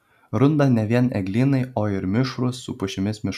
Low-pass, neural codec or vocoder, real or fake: 14.4 kHz; none; real